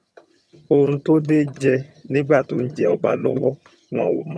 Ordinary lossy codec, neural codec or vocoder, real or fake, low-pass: none; vocoder, 22.05 kHz, 80 mel bands, HiFi-GAN; fake; none